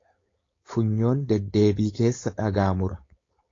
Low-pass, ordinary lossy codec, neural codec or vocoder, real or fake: 7.2 kHz; AAC, 32 kbps; codec, 16 kHz, 4.8 kbps, FACodec; fake